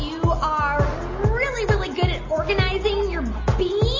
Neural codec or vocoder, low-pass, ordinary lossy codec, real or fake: none; 7.2 kHz; MP3, 32 kbps; real